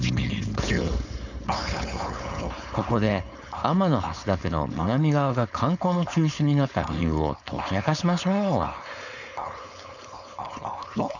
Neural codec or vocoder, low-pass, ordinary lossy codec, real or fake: codec, 16 kHz, 4.8 kbps, FACodec; 7.2 kHz; none; fake